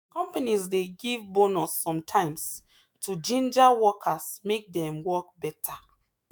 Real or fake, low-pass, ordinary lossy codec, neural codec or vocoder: fake; none; none; autoencoder, 48 kHz, 128 numbers a frame, DAC-VAE, trained on Japanese speech